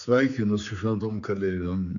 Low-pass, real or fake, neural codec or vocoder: 7.2 kHz; fake; codec, 16 kHz, 4 kbps, X-Codec, HuBERT features, trained on general audio